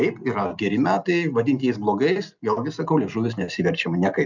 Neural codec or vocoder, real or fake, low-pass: none; real; 7.2 kHz